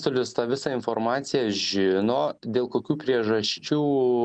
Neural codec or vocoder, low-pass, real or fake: none; 9.9 kHz; real